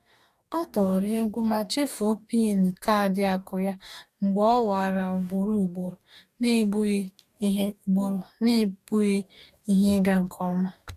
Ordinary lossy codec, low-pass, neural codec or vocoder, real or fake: AAC, 96 kbps; 14.4 kHz; codec, 44.1 kHz, 2.6 kbps, DAC; fake